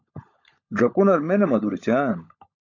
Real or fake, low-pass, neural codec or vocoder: fake; 7.2 kHz; codec, 16 kHz, 16 kbps, FunCodec, trained on LibriTTS, 50 frames a second